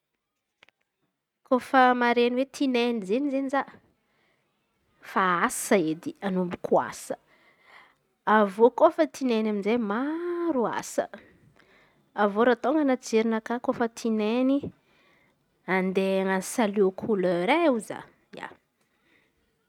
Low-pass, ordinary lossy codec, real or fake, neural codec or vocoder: 19.8 kHz; none; real; none